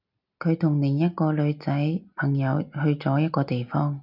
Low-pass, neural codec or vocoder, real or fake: 5.4 kHz; none; real